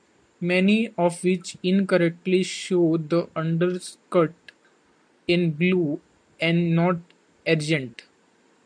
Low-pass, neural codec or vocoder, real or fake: 9.9 kHz; none; real